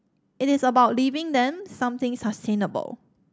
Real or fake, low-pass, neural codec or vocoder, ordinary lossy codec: real; none; none; none